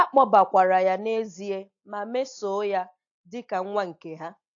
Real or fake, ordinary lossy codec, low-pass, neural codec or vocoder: real; AAC, 48 kbps; 7.2 kHz; none